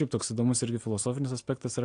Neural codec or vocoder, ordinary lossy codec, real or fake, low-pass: none; AAC, 48 kbps; real; 9.9 kHz